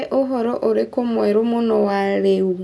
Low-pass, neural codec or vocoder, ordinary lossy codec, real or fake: none; none; none; real